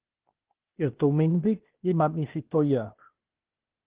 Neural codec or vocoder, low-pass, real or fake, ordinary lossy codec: codec, 16 kHz, 0.8 kbps, ZipCodec; 3.6 kHz; fake; Opus, 16 kbps